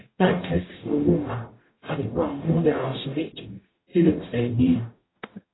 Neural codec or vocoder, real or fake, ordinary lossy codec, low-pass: codec, 44.1 kHz, 0.9 kbps, DAC; fake; AAC, 16 kbps; 7.2 kHz